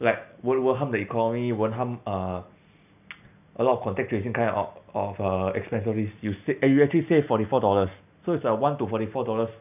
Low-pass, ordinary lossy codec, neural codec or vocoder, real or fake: 3.6 kHz; none; none; real